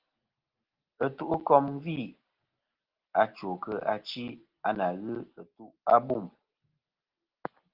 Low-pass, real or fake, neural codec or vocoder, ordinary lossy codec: 5.4 kHz; real; none; Opus, 16 kbps